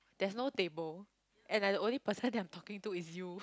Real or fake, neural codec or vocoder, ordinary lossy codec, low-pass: real; none; none; none